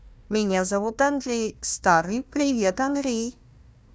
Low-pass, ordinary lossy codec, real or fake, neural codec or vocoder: none; none; fake; codec, 16 kHz, 1 kbps, FunCodec, trained on Chinese and English, 50 frames a second